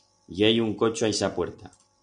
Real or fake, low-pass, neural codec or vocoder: real; 9.9 kHz; none